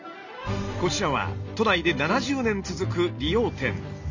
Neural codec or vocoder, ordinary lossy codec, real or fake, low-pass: none; none; real; 7.2 kHz